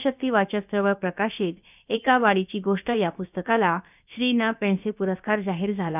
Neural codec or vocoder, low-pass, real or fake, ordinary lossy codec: codec, 16 kHz, about 1 kbps, DyCAST, with the encoder's durations; 3.6 kHz; fake; none